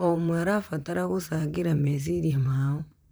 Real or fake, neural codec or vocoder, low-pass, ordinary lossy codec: fake; vocoder, 44.1 kHz, 128 mel bands, Pupu-Vocoder; none; none